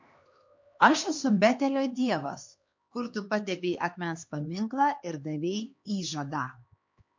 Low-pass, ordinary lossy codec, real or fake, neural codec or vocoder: 7.2 kHz; MP3, 48 kbps; fake; codec, 16 kHz, 2 kbps, X-Codec, HuBERT features, trained on LibriSpeech